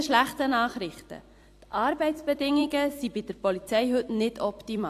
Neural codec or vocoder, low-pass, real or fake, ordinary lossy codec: vocoder, 44.1 kHz, 128 mel bands every 256 samples, BigVGAN v2; 14.4 kHz; fake; AAC, 64 kbps